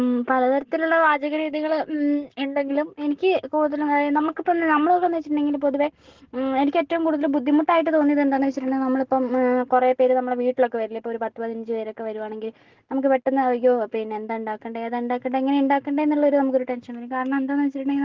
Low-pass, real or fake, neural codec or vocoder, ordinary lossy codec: 7.2 kHz; real; none; Opus, 16 kbps